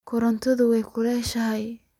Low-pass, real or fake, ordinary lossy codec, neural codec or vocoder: 19.8 kHz; real; none; none